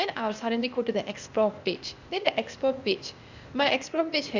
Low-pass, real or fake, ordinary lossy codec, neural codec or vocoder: 7.2 kHz; fake; Opus, 64 kbps; codec, 16 kHz, 0.8 kbps, ZipCodec